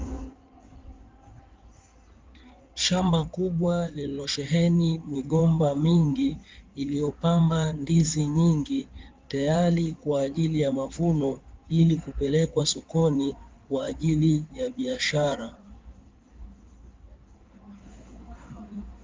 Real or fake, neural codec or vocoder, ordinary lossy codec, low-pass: fake; codec, 16 kHz in and 24 kHz out, 2.2 kbps, FireRedTTS-2 codec; Opus, 32 kbps; 7.2 kHz